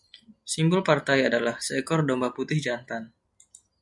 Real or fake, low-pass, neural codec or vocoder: real; 10.8 kHz; none